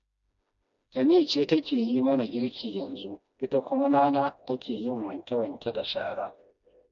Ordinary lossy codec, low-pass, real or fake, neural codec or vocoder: MP3, 64 kbps; 7.2 kHz; fake; codec, 16 kHz, 1 kbps, FreqCodec, smaller model